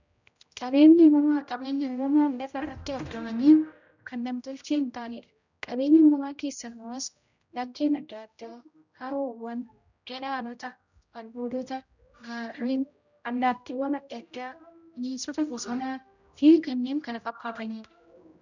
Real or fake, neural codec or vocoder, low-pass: fake; codec, 16 kHz, 0.5 kbps, X-Codec, HuBERT features, trained on general audio; 7.2 kHz